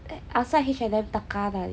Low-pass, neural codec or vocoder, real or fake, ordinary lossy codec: none; none; real; none